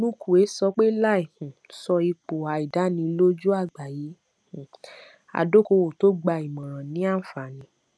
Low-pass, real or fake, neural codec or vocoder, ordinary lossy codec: none; real; none; none